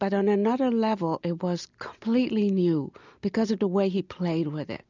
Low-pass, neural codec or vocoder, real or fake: 7.2 kHz; none; real